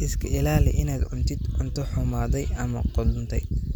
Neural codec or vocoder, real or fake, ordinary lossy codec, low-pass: none; real; none; none